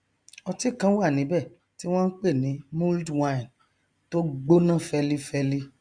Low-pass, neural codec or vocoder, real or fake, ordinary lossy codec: 9.9 kHz; none; real; Opus, 64 kbps